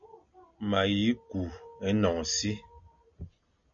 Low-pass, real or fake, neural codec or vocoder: 7.2 kHz; real; none